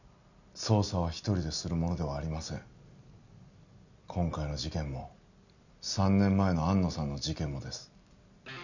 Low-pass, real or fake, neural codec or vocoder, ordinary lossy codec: 7.2 kHz; real; none; none